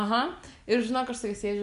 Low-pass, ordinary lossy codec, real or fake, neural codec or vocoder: 10.8 kHz; MP3, 96 kbps; real; none